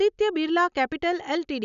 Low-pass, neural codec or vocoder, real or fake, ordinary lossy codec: 7.2 kHz; none; real; none